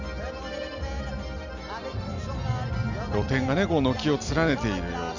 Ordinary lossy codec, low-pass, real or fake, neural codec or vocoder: none; 7.2 kHz; real; none